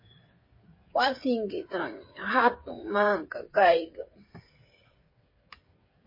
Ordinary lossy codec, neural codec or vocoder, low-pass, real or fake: MP3, 24 kbps; codec, 16 kHz, 8 kbps, FreqCodec, smaller model; 5.4 kHz; fake